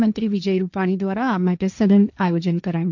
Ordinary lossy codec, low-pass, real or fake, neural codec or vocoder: none; 7.2 kHz; fake; codec, 16 kHz, 1.1 kbps, Voila-Tokenizer